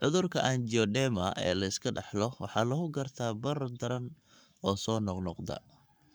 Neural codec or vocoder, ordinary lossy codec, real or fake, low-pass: codec, 44.1 kHz, 7.8 kbps, Pupu-Codec; none; fake; none